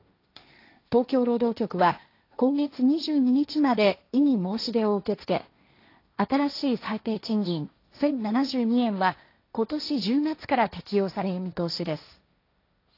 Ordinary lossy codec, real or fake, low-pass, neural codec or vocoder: AAC, 32 kbps; fake; 5.4 kHz; codec, 16 kHz, 1.1 kbps, Voila-Tokenizer